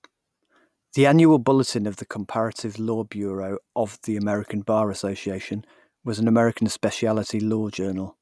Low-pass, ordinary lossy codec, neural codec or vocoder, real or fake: none; none; none; real